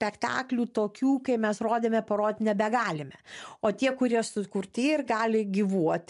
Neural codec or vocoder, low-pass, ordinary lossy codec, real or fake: none; 10.8 kHz; MP3, 64 kbps; real